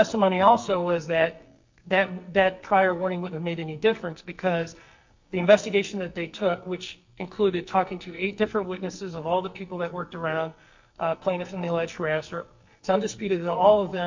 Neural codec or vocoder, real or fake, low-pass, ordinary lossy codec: codec, 44.1 kHz, 2.6 kbps, SNAC; fake; 7.2 kHz; MP3, 48 kbps